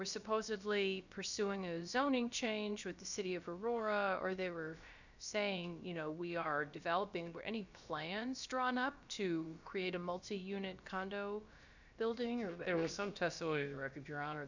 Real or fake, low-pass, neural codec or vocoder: fake; 7.2 kHz; codec, 16 kHz, about 1 kbps, DyCAST, with the encoder's durations